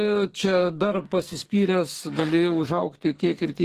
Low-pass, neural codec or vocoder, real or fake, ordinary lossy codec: 14.4 kHz; codec, 44.1 kHz, 2.6 kbps, SNAC; fake; Opus, 16 kbps